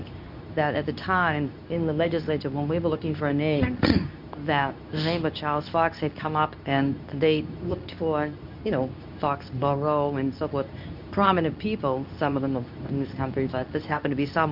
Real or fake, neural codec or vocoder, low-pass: fake; codec, 24 kHz, 0.9 kbps, WavTokenizer, medium speech release version 2; 5.4 kHz